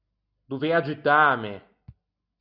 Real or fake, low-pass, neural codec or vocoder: real; 5.4 kHz; none